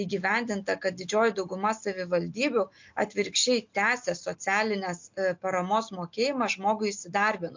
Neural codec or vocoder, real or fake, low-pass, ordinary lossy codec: none; real; 7.2 kHz; MP3, 48 kbps